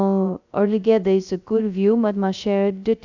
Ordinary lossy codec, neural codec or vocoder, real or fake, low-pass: none; codec, 16 kHz, 0.2 kbps, FocalCodec; fake; 7.2 kHz